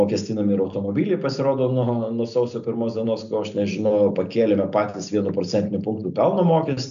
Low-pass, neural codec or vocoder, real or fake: 7.2 kHz; none; real